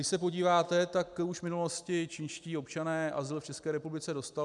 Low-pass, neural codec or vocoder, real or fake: 10.8 kHz; none; real